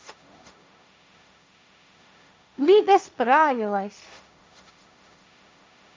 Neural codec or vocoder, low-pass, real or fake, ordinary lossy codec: codec, 16 kHz, 1.1 kbps, Voila-Tokenizer; none; fake; none